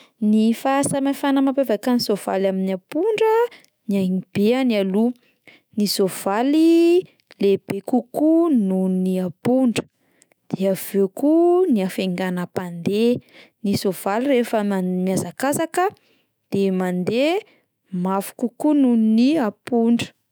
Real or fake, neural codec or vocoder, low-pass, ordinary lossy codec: fake; autoencoder, 48 kHz, 128 numbers a frame, DAC-VAE, trained on Japanese speech; none; none